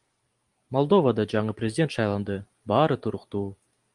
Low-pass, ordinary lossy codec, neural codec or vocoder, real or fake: 10.8 kHz; Opus, 32 kbps; none; real